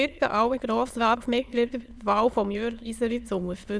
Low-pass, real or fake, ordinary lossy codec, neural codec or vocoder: none; fake; none; autoencoder, 22.05 kHz, a latent of 192 numbers a frame, VITS, trained on many speakers